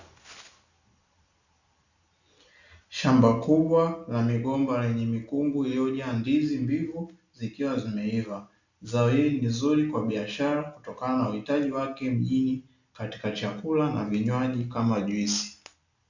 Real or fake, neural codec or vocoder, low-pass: real; none; 7.2 kHz